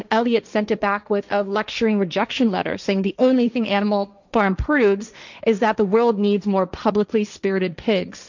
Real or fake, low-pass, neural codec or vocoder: fake; 7.2 kHz; codec, 16 kHz, 1.1 kbps, Voila-Tokenizer